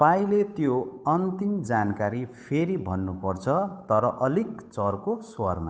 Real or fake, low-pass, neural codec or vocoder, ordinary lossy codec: fake; none; codec, 16 kHz, 8 kbps, FunCodec, trained on Chinese and English, 25 frames a second; none